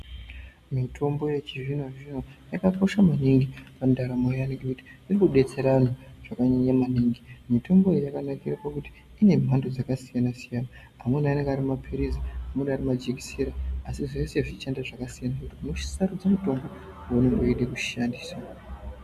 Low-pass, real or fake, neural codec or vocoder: 14.4 kHz; real; none